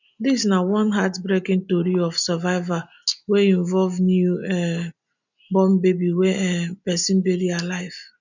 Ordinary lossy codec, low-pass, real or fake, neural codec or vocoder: none; 7.2 kHz; real; none